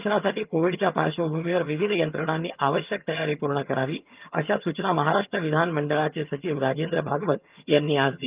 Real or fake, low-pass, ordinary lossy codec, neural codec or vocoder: fake; 3.6 kHz; Opus, 24 kbps; vocoder, 22.05 kHz, 80 mel bands, HiFi-GAN